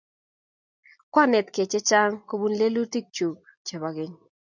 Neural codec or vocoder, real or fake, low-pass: none; real; 7.2 kHz